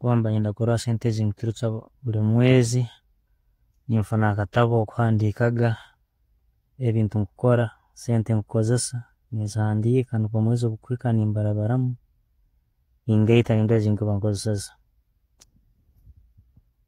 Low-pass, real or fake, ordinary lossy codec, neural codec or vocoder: 19.8 kHz; fake; AAC, 48 kbps; vocoder, 48 kHz, 128 mel bands, Vocos